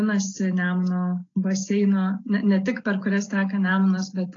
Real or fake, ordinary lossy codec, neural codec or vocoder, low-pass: real; AAC, 32 kbps; none; 7.2 kHz